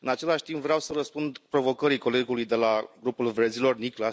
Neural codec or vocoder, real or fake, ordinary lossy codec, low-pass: none; real; none; none